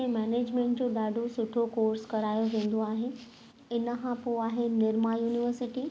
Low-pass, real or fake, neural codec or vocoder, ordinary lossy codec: none; real; none; none